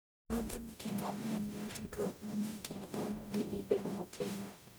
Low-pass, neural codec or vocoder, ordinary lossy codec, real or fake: none; codec, 44.1 kHz, 0.9 kbps, DAC; none; fake